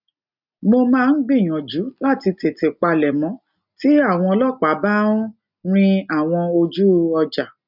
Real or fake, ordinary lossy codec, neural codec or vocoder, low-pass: real; none; none; 5.4 kHz